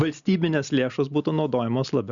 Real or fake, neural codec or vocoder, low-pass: real; none; 7.2 kHz